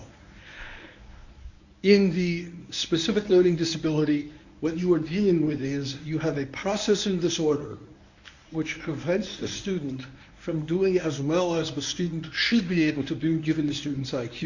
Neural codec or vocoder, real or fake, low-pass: codec, 24 kHz, 0.9 kbps, WavTokenizer, medium speech release version 1; fake; 7.2 kHz